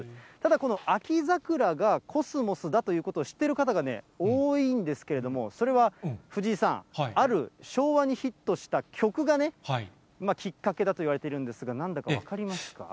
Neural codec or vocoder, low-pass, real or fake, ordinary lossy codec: none; none; real; none